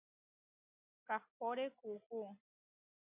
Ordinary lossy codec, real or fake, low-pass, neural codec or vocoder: MP3, 32 kbps; real; 3.6 kHz; none